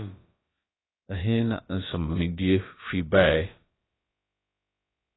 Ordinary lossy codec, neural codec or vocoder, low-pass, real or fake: AAC, 16 kbps; codec, 16 kHz, about 1 kbps, DyCAST, with the encoder's durations; 7.2 kHz; fake